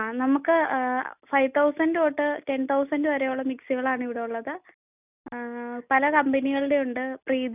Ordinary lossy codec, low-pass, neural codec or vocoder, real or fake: none; 3.6 kHz; none; real